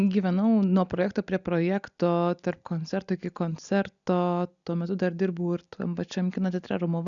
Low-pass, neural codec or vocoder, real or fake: 7.2 kHz; none; real